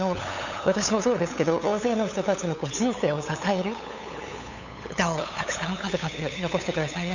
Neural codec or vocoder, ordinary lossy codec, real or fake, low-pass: codec, 16 kHz, 8 kbps, FunCodec, trained on LibriTTS, 25 frames a second; none; fake; 7.2 kHz